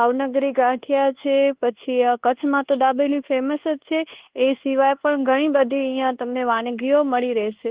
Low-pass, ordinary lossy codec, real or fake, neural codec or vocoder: 3.6 kHz; Opus, 16 kbps; fake; codec, 24 kHz, 1.2 kbps, DualCodec